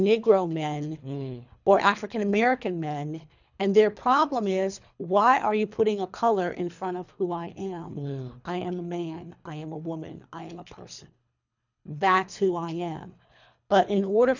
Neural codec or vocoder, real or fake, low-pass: codec, 24 kHz, 3 kbps, HILCodec; fake; 7.2 kHz